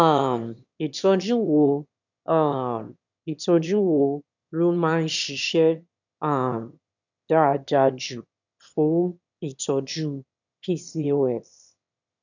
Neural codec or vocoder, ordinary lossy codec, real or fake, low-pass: autoencoder, 22.05 kHz, a latent of 192 numbers a frame, VITS, trained on one speaker; none; fake; 7.2 kHz